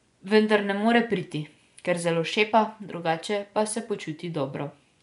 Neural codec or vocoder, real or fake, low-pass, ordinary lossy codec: vocoder, 24 kHz, 100 mel bands, Vocos; fake; 10.8 kHz; none